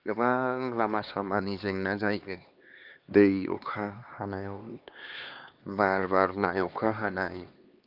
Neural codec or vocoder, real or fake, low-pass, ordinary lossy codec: codec, 16 kHz, 2 kbps, X-Codec, HuBERT features, trained on LibriSpeech; fake; 5.4 kHz; Opus, 32 kbps